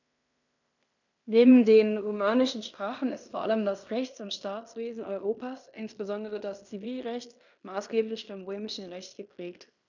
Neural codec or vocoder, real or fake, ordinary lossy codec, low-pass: codec, 16 kHz in and 24 kHz out, 0.9 kbps, LongCat-Audio-Codec, fine tuned four codebook decoder; fake; none; 7.2 kHz